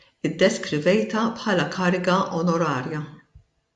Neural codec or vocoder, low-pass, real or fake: none; 10.8 kHz; real